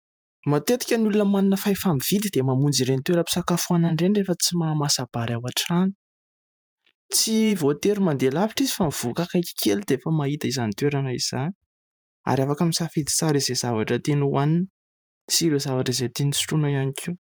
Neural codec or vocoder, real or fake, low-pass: vocoder, 44.1 kHz, 128 mel bands every 512 samples, BigVGAN v2; fake; 19.8 kHz